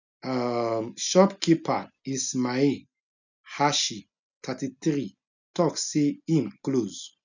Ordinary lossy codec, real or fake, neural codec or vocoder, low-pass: none; real; none; 7.2 kHz